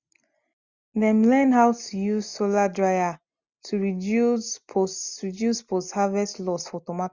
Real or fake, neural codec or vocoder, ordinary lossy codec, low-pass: real; none; Opus, 64 kbps; 7.2 kHz